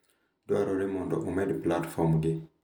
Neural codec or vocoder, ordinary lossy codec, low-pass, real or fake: none; none; none; real